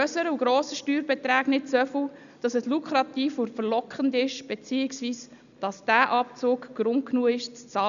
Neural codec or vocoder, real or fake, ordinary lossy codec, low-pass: none; real; none; 7.2 kHz